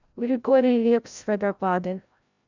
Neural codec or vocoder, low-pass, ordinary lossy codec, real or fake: codec, 16 kHz, 0.5 kbps, FreqCodec, larger model; 7.2 kHz; none; fake